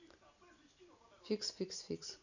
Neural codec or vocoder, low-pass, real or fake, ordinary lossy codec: none; 7.2 kHz; real; none